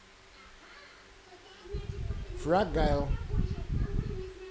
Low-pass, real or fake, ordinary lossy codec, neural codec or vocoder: none; real; none; none